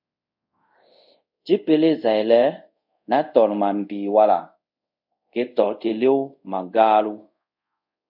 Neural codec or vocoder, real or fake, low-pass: codec, 24 kHz, 0.5 kbps, DualCodec; fake; 5.4 kHz